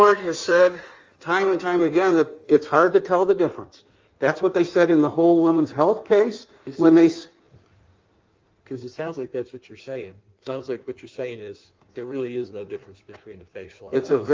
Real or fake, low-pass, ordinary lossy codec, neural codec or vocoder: fake; 7.2 kHz; Opus, 32 kbps; codec, 16 kHz in and 24 kHz out, 1.1 kbps, FireRedTTS-2 codec